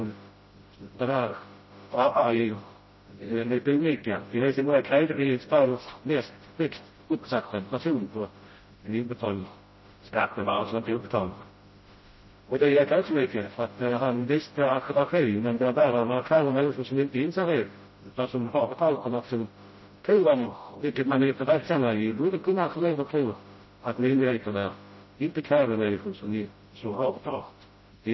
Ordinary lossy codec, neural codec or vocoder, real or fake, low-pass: MP3, 24 kbps; codec, 16 kHz, 0.5 kbps, FreqCodec, smaller model; fake; 7.2 kHz